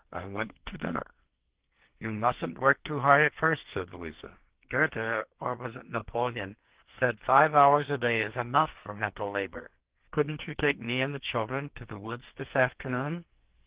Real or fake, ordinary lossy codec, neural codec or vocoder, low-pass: fake; Opus, 16 kbps; codec, 44.1 kHz, 2.6 kbps, SNAC; 3.6 kHz